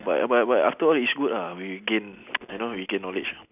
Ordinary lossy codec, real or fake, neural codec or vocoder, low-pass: none; real; none; 3.6 kHz